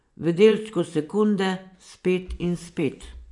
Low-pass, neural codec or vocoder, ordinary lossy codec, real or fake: 10.8 kHz; vocoder, 24 kHz, 100 mel bands, Vocos; none; fake